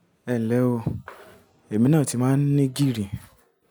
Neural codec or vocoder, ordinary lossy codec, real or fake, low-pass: none; none; real; none